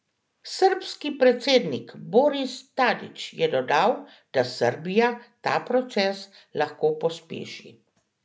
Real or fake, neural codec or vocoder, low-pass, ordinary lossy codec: real; none; none; none